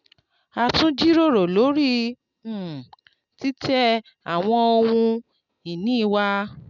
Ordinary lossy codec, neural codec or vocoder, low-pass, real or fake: none; none; 7.2 kHz; real